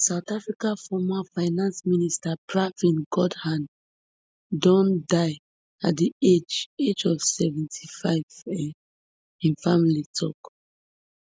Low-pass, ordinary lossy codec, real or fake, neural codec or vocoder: none; none; real; none